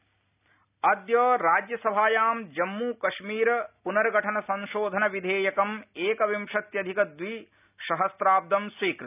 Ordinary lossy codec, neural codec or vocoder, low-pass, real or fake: none; none; 3.6 kHz; real